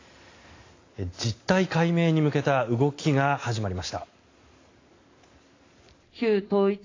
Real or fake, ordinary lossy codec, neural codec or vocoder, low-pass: real; AAC, 32 kbps; none; 7.2 kHz